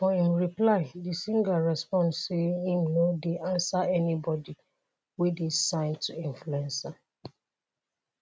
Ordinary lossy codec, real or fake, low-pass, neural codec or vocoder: none; real; none; none